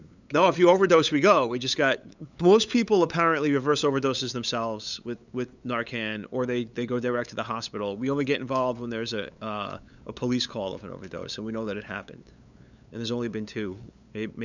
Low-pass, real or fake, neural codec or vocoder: 7.2 kHz; fake; codec, 16 kHz, 8 kbps, FunCodec, trained on LibriTTS, 25 frames a second